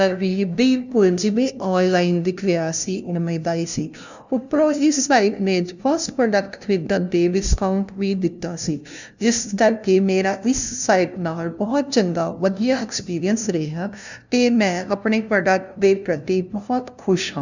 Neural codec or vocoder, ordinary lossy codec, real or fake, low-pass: codec, 16 kHz, 0.5 kbps, FunCodec, trained on LibriTTS, 25 frames a second; none; fake; 7.2 kHz